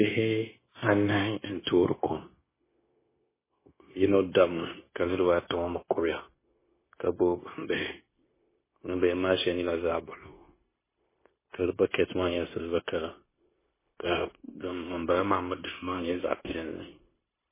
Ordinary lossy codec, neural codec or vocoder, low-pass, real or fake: MP3, 16 kbps; codec, 16 kHz, 0.9 kbps, LongCat-Audio-Codec; 3.6 kHz; fake